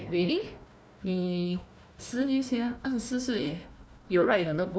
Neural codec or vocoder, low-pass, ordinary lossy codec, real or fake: codec, 16 kHz, 1 kbps, FunCodec, trained on Chinese and English, 50 frames a second; none; none; fake